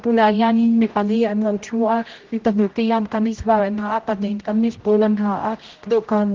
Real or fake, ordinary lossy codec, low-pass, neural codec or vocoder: fake; Opus, 16 kbps; 7.2 kHz; codec, 16 kHz, 0.5 kbps, X-Codec, HuBERT features, trained on general audio